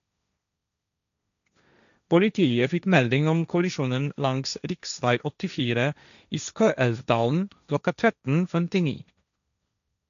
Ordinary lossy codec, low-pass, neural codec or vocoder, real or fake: none; 7.2 kHz; codec, 16 kHz, 1.1 kbps, Voila-Tokenizer; fake